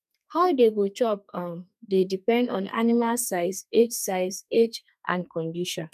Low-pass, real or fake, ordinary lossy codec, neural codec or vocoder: 14.4 kHz; fake; none; codec, 32 kHz, 1.9 kbps, SNAC